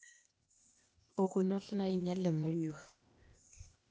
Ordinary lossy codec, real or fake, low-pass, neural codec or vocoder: none; fake; none; codec, 16 kHz, 0.8 kbps, ZipCodec